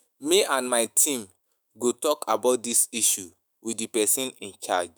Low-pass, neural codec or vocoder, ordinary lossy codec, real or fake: none; autoencoder, 48 kHz, 128 numbers a frame, DAC-VAE, trained on Japanese speech; none; fake